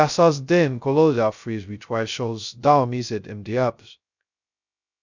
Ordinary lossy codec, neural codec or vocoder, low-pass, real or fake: none; codec, 16 kHz, 0.2 kbps, FocalCodec; 7.2 kHz; fake